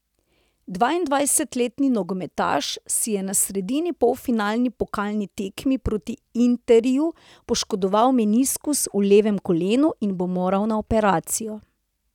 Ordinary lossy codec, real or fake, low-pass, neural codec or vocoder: none; real; 19.8 kHz; none